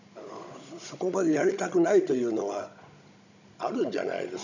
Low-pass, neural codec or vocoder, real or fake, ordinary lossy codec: 7.2 kHz; codec, 16 kHz, 16 kbps, FunCodec, trained on Chinese and English, 50 frames a second; fake; none